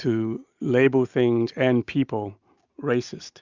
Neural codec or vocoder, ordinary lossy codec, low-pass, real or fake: none; Opus, 64 kbps; 7.2 kHz; real